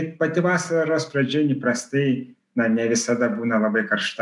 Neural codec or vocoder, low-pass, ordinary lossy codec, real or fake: none; 10.8 kHz; MP3, 96 kbps; real